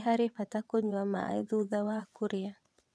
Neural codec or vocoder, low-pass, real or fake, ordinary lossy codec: vocoder, 22.05 kHz, 80 mel bands, WaveNeXt; none; fake; none